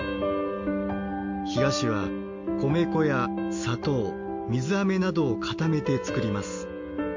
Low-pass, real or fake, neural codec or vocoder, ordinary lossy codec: 7.2 kHz; real; none; MP3, 48 kbps